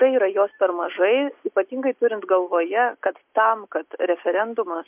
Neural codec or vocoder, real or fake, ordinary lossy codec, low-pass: none; real; MP3, 32 kbps; 3.6 kHz